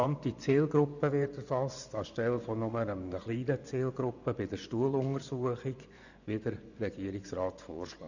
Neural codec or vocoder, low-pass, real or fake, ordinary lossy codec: vocoder, 24 kHz, 100 mel bands, Vocos; 7.2 kHz; fake; none